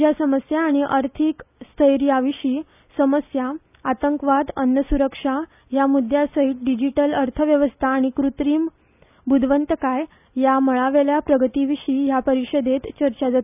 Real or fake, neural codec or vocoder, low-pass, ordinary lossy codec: real; none; 3.6 kHz; none